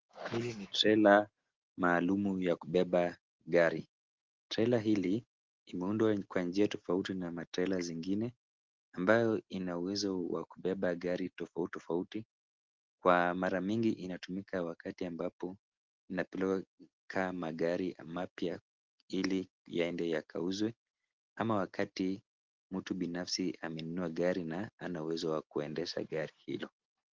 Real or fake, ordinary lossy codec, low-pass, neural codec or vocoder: real; Opus, 16 kbps; 7.2 kHz; none